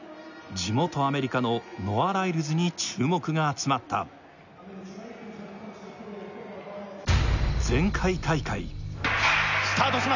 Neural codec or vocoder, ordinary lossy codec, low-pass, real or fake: none; none; 7.2 kHz; real